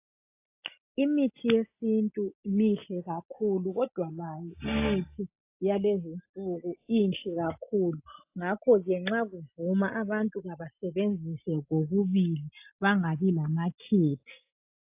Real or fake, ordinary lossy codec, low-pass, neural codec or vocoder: real; AAC, 32 kbps; 3.6 kHz; none